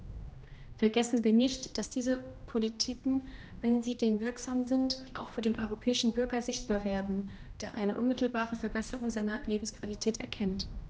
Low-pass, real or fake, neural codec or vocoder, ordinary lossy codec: none; fake; codec, 16 kHz, 1 kbps, X-Codec, HuBERT features, trained on general audio; none